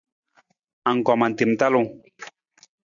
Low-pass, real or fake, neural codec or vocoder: 7.2 kHz; real; none